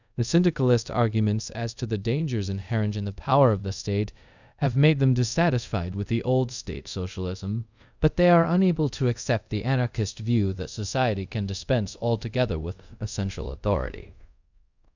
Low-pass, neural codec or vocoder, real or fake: 7.2 kHz; codec, 24 kHz, 0.5 kbps, DualCodec; fake